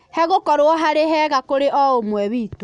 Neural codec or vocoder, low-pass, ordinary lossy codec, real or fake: none; 9.9 kHz; none; real